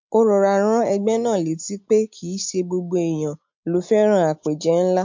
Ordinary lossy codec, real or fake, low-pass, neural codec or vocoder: MP3, 48 kbps; real; 7.2 kHz; none